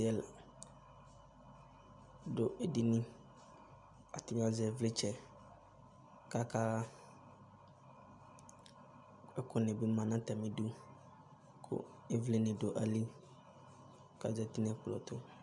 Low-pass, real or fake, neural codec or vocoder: 10.8 kHz; real; none